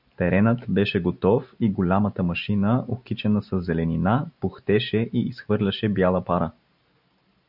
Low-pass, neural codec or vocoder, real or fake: 5.4 kHz; none; real